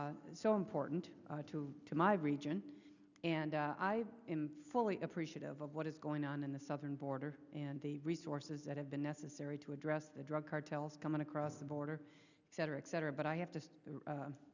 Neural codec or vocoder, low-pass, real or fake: none; 7.2 kHz; real